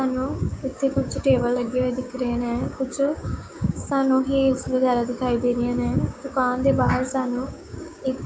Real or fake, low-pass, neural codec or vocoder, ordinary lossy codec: fake; none; codec, 16 kHz, 6 kbps, DAC; none